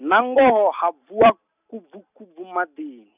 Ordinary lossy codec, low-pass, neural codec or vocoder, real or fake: none; 3.6 kHz; none; real